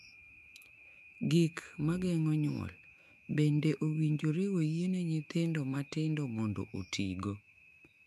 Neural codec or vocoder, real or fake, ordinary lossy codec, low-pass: autoencoder, 48 kHz, 128 numbers a frame, DAC-VAE, trained on Japanese speech; fake; MP3, 96 kbps; 14.4 kHz